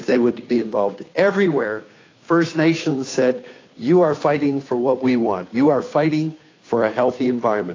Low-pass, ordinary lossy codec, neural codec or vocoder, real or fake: 7.2 kHz; AAC, 32 kbps; codec, 16 kHz, 2 kbps, FunCodec, trained on Chinese and English, 25 frames a second; fake